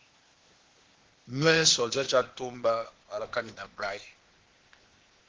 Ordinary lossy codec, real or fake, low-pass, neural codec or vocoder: Opus, 16 kbps; fake; 7.2 kHz; codec, 16 kHz, 0.8 kbps, ZipCodec